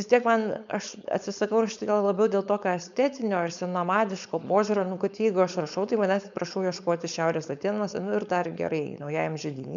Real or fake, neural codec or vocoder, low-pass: fake; codec, 16 kHz, 4.8 kbps, FACodec; 7.2 kHz